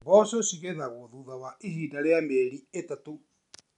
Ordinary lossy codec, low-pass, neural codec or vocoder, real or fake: none; 10.8 kHz; none; real